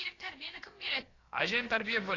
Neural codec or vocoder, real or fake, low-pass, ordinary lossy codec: codec, 16 kHz, about 1 kbps, DyCAST, with the encoder's durations; fake; 7.2 kHz; AAC, 32 kbps